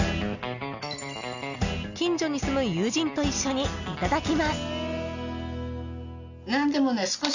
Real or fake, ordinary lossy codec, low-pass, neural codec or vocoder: real; none; 7.2 kHz; none